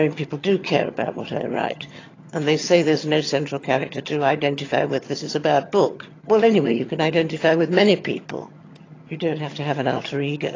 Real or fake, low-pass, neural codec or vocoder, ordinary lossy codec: fake; 7.2 kHz; vocoder, 22.05 kHz, 80 mel bands, HiFi-GAN; AAC, 32 kbps